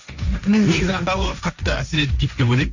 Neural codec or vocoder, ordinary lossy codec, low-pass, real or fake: codec, 16 kHz, 1.1 kbps, Voila-Tokenizer; Opus, 64 kbps; 7.2 kHz; fake